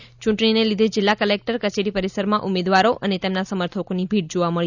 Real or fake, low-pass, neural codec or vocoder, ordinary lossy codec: real; 7.2 kHz; none; none